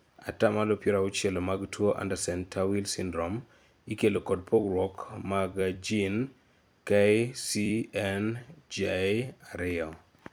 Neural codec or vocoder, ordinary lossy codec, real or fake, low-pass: vocoder, 44.1 kHz, 128 mel bands every 256 samples, BigVGAN v2; none; fake; none